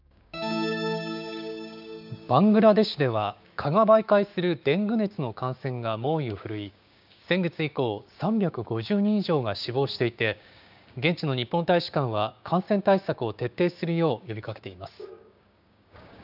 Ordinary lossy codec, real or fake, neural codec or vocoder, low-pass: none; fake; codec, 16 kHz, 6 kbps, DAC; 5.4 kHz